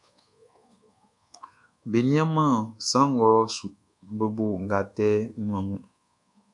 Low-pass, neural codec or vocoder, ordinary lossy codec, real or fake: 10.8 kHz; codec, 24 kHz, 1.2 kbps, DualCodec; MP3, 96 kbps; fake